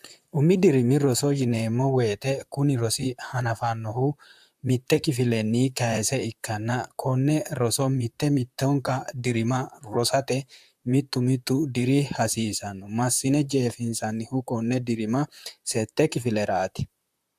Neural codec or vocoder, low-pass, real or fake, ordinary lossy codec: vocoder, 44.1 kHz, 128 mel bands, Pupu-Vocoder; 14.4 kHz; fake; AAC, 96 kbps